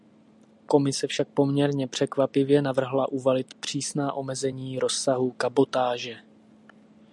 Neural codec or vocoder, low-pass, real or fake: none; 10.8 kHz; real